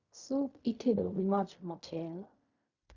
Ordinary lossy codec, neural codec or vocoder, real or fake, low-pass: Opus, 64 kbps; codec, 16 kHz in and 24 kHz out, 0.4 kbps, LongCat-Audio-Codec, fine tuned four codebook decoder; fake; 7.2 kHz